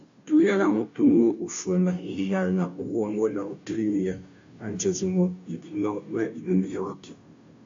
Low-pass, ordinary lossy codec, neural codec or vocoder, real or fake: 7.2 kHz; AAC, 48 kbps; codec, 16 kHz, 0.5 kbps, FunCodec, trained on Chinese and English, 25 frames a second; fake